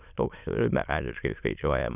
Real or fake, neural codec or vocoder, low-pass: fake; autoencoder, 22.05 kHz, a latent of 192 numbers a frame, VITS, trained on many speakers; 3.6 kHz